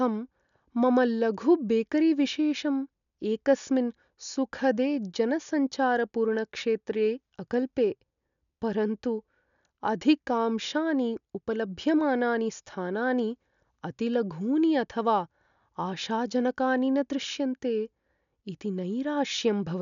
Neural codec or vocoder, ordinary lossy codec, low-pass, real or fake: none; none; 7.2 kHz; real